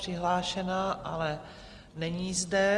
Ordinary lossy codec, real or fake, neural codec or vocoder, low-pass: Opus, 32 kbps; real; none; 10.8 kHz